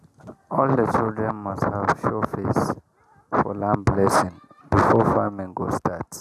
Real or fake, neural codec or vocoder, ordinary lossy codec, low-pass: real; none; none; 14.4 kHz